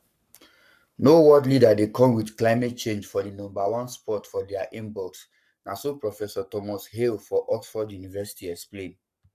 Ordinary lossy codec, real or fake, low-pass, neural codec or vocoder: none; fake; 14.4 kHz; codec, 44.1 kHz, 7.8 kbps, Pupu-Codec